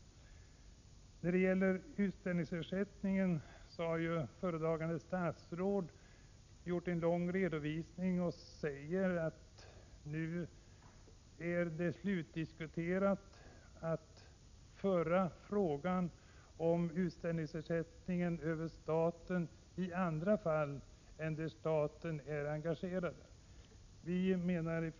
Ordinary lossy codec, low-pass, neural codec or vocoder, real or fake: none; 7.2 kHz; none; real